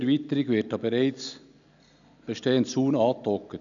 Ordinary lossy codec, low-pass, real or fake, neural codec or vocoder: none; 7.2 kHz; real; none